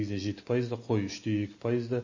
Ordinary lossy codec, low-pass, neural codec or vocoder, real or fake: MP3, 32 kbps; 7.2 kHz; none; real